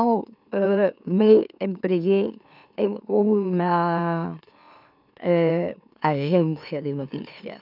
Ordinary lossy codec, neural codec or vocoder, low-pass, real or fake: none; autoencoder, 44.1 kHz, a latent of 192 numbers a frame, MeloTTS; 5.4 kHz; fake